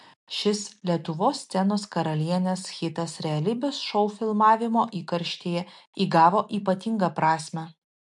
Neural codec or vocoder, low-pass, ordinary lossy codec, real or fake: none; 10.8 kHz; MP3, 64 kbps; real